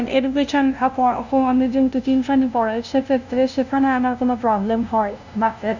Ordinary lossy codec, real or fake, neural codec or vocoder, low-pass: none; fake; codec, 16 kHz, 0.5 kbps, FunCodec, trained on LibriTTS, 25 frames a second; 7.2 kHz